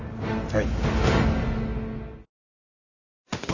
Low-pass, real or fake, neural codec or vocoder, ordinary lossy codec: 7.2 kHz; real; none; none